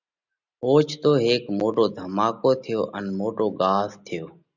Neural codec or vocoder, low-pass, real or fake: none; 7.2 kHz; real